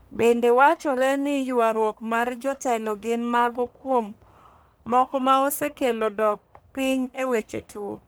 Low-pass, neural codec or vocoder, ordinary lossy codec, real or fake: none; codec, 44.1 kHz, 1.7 kbps, Pupu-Codec; none; fake